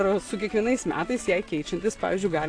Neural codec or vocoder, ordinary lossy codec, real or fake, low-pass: none; AAC, 48 kbps; real; 9.9 kHz